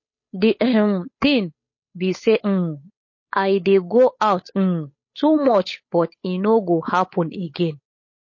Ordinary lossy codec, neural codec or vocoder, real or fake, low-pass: MP3, 32 kbps; codec, 16 kHz, 8 kbps, FunCodec, trained on Chinese and English, 25 frames a second; fake; 7.2 kHz